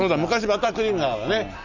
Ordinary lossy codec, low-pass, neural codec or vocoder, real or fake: none; 7.2 kHz; none; real